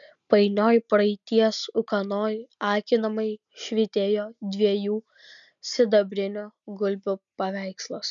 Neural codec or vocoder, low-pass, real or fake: none; 7.2 kHz; real